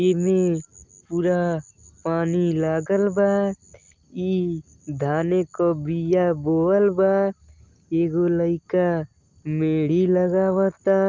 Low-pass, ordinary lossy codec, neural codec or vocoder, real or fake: 7.2 kHz; Opus, 32 kbps; none; real